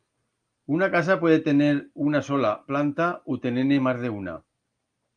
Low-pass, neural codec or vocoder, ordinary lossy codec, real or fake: 9.9 kHz; none; Opus, 32 kbps; real